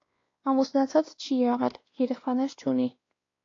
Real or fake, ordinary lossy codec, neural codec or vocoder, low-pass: fake; AAC, 32 kbps; codec, 16 kHz, 2 kbps, X-Codec, WavLM features, trained on Multilingual LibriSpeech; 7.2 kHz